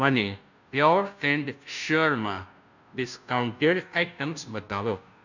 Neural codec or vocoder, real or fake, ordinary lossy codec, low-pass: codec, 16 kHz, 0.5 kbps, FunCodec, trained on Chinese and English, 25 frames a second; fake; none; 7.2 kHz